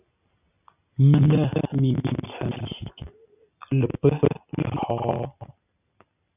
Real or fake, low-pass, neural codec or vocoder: real; 3.6 kHz; none